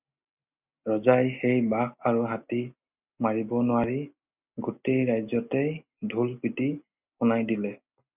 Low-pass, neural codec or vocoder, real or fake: 3.6 kHz; none; real